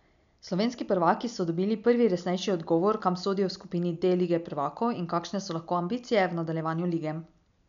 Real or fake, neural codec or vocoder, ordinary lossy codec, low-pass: real; none; none; 7.2 kHz